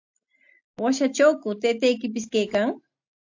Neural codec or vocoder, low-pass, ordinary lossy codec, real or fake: none; 7.2 kHz; AAC, 48 kbps; real